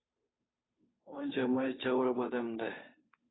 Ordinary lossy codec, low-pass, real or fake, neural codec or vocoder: AAC, 16 kbps; 7.2 kHz; fake; codec, 16 kHz, 2 kbps, FunCodec, trained on Chinese and English, 25 frames a second